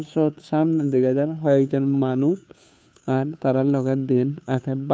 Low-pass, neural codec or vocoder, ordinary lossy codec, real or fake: none; codec, 16 kHz, 2 kbps, FunCodec, trained on Chinese and English, 25 frames a second; none; fake